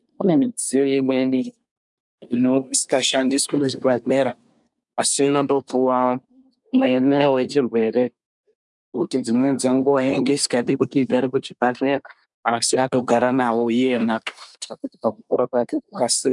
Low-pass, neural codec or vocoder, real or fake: 10.8 kHz; codec, 24 kHz, 1 kbps, SNAC; fake